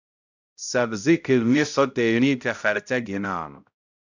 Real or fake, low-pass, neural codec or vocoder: fake; 7.2 kHz; codec, 16 kHz, 0.5 kbps, X-Codec, HuBERT features, trained on balanced general audio